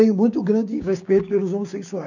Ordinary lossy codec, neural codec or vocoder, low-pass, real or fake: none; codec, 16 kHz, 6 kbps, DAC; 7.2 kHz; fake